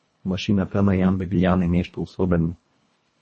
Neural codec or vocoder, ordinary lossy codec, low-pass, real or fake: codec, 24 kHz, 1.5 kbps, HILCodec; MP3, 32 kbps; 10.8 kHz; fake